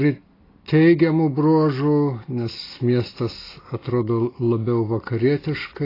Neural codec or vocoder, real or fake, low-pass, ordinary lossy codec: none; real; 5.4 kHz; AAC, 24 kbps